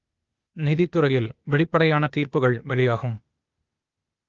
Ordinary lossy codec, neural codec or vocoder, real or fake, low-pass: Opus, 32 kbps; codec, 16 kHz, 0.8 kbps, ZipCodec; fake; 7.2 kHz